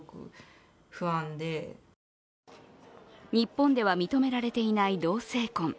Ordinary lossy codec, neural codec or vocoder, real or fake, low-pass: none; none; real; none